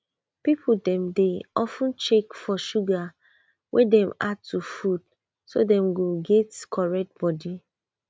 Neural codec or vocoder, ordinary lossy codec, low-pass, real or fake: none; none; none; real